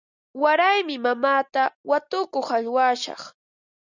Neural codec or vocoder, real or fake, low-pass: none; real; 7.2 kHz